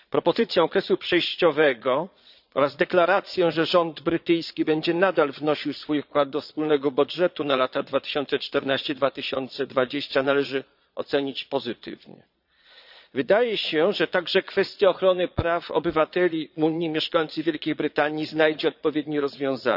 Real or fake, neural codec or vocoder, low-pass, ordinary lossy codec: fake; vocoder, 22.05 kHz, 80 mel bands, Vocos; 5.4 kHz; none